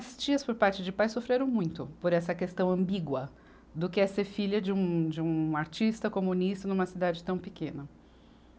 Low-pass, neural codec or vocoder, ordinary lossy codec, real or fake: none; none; none; real